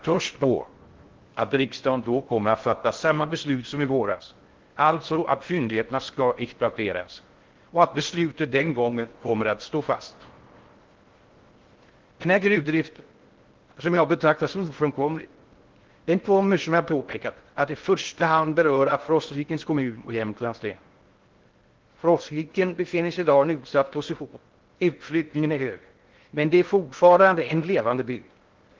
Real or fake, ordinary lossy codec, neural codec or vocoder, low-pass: fake; Opus, 16 kbps; codec, 16 kHz in and 24 kHz out, 0.6 kbps, FocalCodec, streaming, 4096 codes; 7.2 kHz